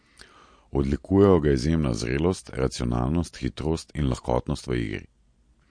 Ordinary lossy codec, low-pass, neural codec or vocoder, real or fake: MP3, 48 kbps; 9.9 kHz; none; real